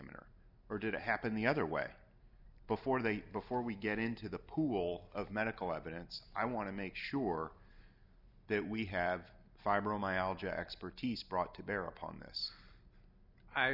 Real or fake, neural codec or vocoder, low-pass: real; none; 5.4 kHz